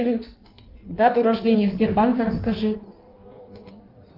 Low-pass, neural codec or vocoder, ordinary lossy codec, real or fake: 5.4 kHz; codec, 16 kHz in and 24 kHz out, 1.1 kbps, FireRedTTS-2 codec; Opus, 24 kbps; fake